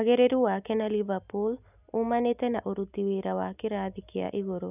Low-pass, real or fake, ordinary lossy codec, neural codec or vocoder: 3.6 kHz; real; none; none